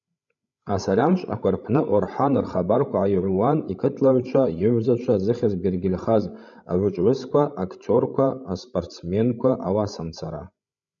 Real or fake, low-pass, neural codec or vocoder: fake; 7.2 kHz; codec, 16 kHz, 16 kbps, FreqCodec, larger model